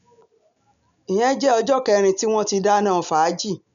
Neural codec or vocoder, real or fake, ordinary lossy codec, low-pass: none; real; none; 7.2 kHz